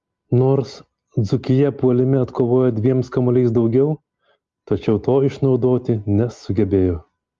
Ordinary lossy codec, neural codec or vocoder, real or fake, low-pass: Opus, 32 kbps; none; real; 7.2 kHz